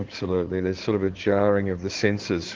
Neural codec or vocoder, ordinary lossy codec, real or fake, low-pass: codec, 16 kHz in and 24 kHz out, 2.2 kbps, FireRedTTS-2 codec; Opus, 16 kbps; fake; 7.2 kHz